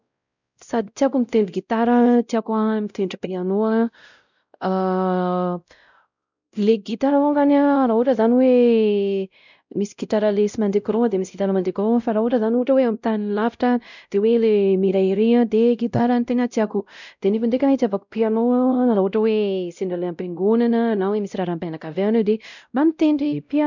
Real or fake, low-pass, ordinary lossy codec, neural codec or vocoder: fake; 7.2 kHz; none; codec, 16 kHz, 0.5 kbps, X-Codec, WavLM features, trained on Multilingual LibriSpeech